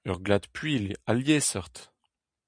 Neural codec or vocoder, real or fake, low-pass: none; real; 9.9 kHz